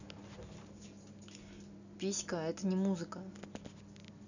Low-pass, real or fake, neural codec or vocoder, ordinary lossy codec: 7.2 kHz; real; none; none